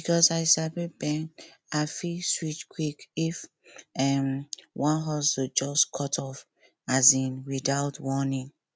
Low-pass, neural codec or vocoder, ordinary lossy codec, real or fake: none; none; none; real